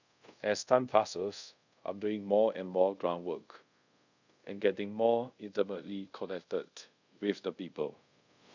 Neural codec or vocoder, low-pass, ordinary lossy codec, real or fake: codec, 24 kHz, 0.5 kbps, DualCodec; 7.2 kHz; none; fake